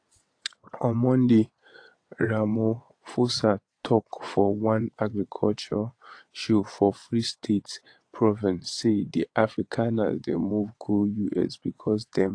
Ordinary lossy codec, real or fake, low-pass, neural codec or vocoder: AAC, 48 kbps; fake; 9.9 kHz; vocoder, 22.05 kHz, 80 mel bands, WaveNeXt